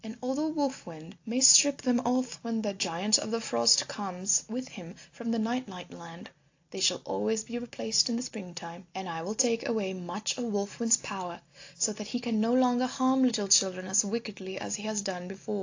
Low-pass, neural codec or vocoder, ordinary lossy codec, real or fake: 7.2 kHz; none; AAC, 48 kbps; real